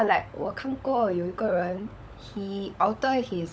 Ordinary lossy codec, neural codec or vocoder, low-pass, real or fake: none; codec, 16 kHz, 16 kbps, FunCodec, trained on LibriTTS, 50 frames a second; none; fake